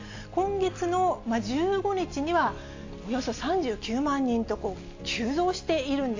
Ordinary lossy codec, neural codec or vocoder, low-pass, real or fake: AAC, 48 kbps; none; 7.2 kHz; real